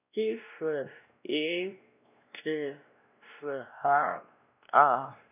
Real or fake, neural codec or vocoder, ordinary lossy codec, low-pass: fake; codec, 16 kHz, 1 kbps, X-Codec, WavLM features, trained on Multilingual LibriSpeech; none; 3.6 kHz